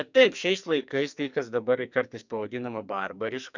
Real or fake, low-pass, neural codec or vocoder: fake; 7.2 kHz; codec, 44.1 kHz, 2.6 kbps, SNAC